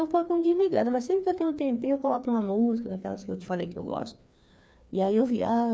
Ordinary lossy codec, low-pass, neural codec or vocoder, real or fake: none; none; codec, 16 kHz, 2 kbps, FreqCodec, larger model; fake